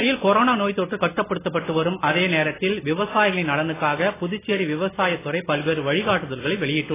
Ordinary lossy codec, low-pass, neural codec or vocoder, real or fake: AAC, 16 kbps; 3.6 kHz; none; real